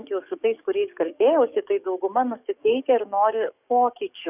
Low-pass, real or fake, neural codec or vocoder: 3.6 kHz; fake; codec, 44.1 kHz, 7.8 kbps, DAC